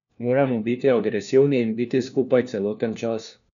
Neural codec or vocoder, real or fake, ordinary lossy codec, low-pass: codec, 16 kHz, 1 kbps, FunCodec, trained on LibriTTS, 50 frames a second; fake; none; 7.2 kHz